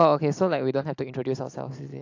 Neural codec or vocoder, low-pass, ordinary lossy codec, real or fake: none; 7.2 kHz; AAC, 48 kbps; real